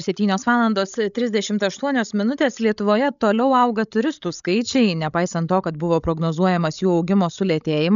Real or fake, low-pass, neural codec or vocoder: fake; 7.2 kHz; codec, 16 kHz, 16 kbps, FreqCodec, larger model